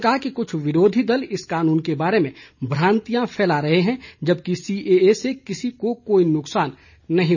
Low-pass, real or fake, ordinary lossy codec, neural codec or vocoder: 7.2 kHz; real; none; none